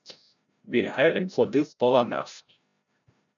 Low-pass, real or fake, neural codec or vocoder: 7.2 kHz; fake; codec, 16 kHz, 0.5 kbps, FreqCodec, larger model